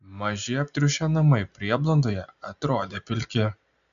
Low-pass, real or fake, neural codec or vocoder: 7.2 kHz; real; none